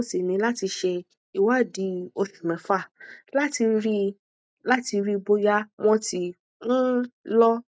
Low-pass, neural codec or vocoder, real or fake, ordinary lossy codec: none; none; real; none